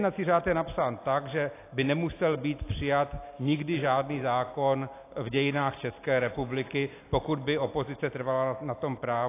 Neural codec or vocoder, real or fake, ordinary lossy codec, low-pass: none; real; AAC, 24 kbps; 3.6 kHz